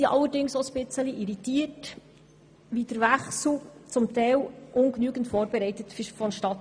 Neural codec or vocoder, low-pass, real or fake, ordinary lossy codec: none; 9.9 kHz; real; none